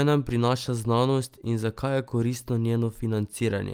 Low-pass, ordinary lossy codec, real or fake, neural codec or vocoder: 19.8 kHz; Opus, 32 kbps; real; none